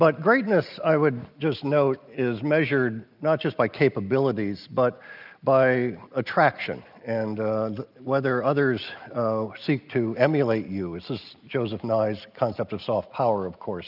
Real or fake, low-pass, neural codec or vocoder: real; 5.4 kHz; none